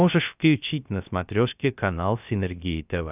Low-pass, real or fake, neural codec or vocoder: 3.6 kHz; fake; codec, 16 kHz, 0.3 kbps, FocalCodec